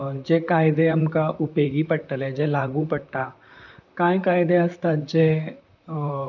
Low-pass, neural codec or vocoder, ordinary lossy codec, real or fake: 7.2 kHz; vocoder, 44.1 kHz, 128 mel bands, Pupu-Vocoder; none; fake